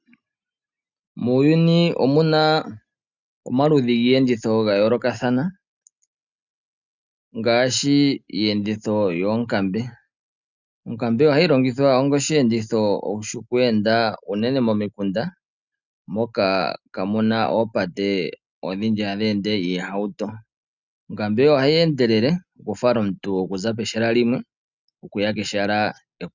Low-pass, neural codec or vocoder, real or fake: 7.2 kHz; none; real